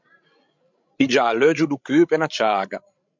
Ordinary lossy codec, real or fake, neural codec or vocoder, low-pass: MP3, 64 kbps; fake; codec, 16 kHz, 16 kbps, FreqCodec, larger model; 7.2 kHz